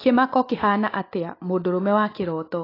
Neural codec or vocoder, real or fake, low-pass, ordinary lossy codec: none; real; 5.4 kHz; AAC, 24 kbps